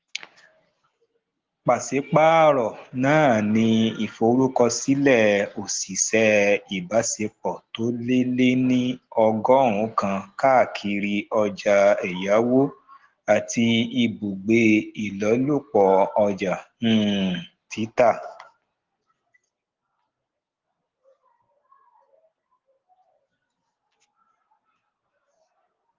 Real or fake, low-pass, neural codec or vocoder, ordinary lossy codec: real; 7.2 kHz; none; Opus, 16 kbps